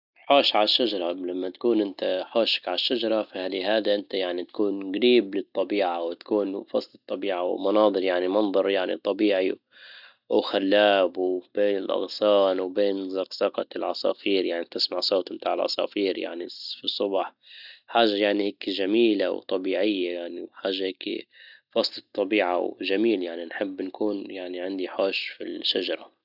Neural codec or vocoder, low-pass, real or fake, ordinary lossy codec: none; 5.4 kHz; real; none